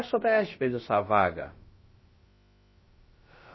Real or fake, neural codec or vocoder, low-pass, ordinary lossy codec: fake; codec, 16 kHz, about 1 kbps, DyCAST, with the encoder's durations; 7.2 kHz; MP3, 24 kbps